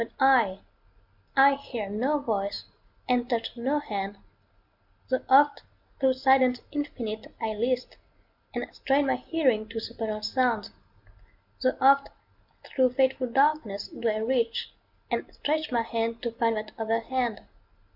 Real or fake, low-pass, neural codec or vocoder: real; 5.4 kHz; none